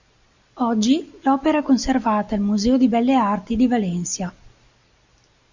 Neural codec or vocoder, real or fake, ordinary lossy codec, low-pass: none; real; Opus, 64 kbps; 7.2 kHz